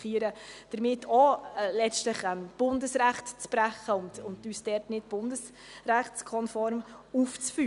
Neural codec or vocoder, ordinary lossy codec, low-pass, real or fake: none; none; 10.8 kHz; real